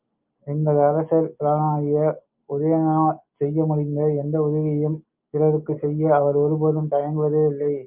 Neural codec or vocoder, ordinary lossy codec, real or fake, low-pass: none; Opus, 24 kbps; real; 3.6 kHz